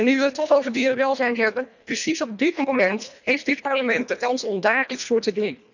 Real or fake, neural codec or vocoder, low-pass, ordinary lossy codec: fake; codec, 24 kHz, 1.5 kbps, HILCodec; 7.2 kHz; none